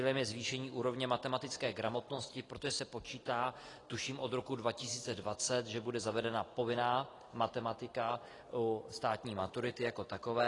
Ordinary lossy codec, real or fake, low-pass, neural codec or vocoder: AAC, 32 kbps; real; 10.8 kHz; none